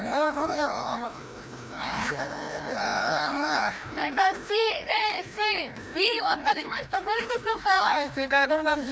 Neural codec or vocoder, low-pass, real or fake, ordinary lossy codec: codec, 16 kHz, 1 kbps, FreqCodec, larger model; none; fake; none